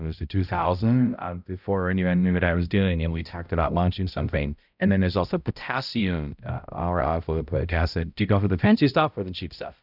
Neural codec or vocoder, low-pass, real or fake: codec, 16 kHz, 0.5 kbps, X-Codec, HuBERT features, trained on balanced general audio; 5.4 kHz; fake